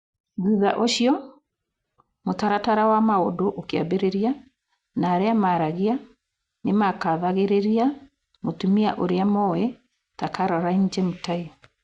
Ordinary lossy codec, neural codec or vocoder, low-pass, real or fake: Opus, 64 kbps; none; 10.8 kHz; real